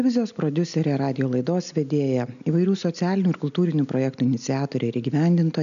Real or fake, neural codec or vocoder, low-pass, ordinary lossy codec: real; none; 7.2 kHz; MP3, 96 kbps